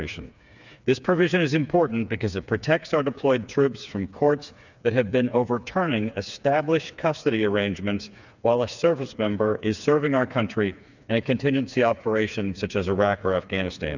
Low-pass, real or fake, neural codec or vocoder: 7.2 kHz; fake; codec, 16 kHz, 4 kbps, FreqCodec, smaller model